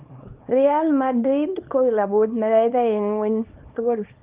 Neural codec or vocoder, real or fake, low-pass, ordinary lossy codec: codec, 24 kHz, 0.9 kbps, WavTokenizer, small release; fake; 3.6 kHz; Opus, 24 kbps